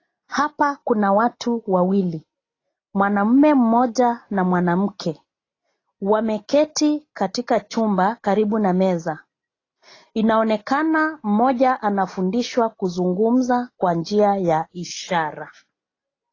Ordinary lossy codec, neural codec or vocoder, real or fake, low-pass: AAC, 32 kbps; none; real; 7.2 kHz